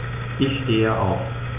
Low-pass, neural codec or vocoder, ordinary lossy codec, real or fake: 3.6 kHz; none; none; real